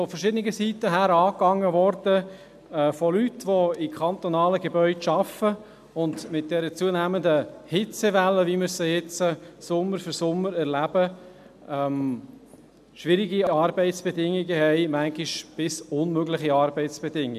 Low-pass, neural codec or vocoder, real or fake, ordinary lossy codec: 14.4 kHz; none; real; none